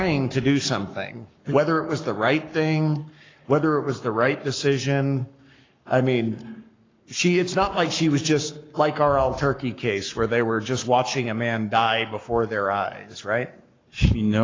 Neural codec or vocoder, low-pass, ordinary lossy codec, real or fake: codec, 44.1 kHz, 7.8 kbps, Pupu-Codec; 7.2 kHz; AAC, 32 kbps; fake